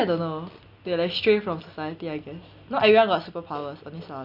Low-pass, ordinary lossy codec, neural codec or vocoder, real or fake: 5.4 kHz; none; none; real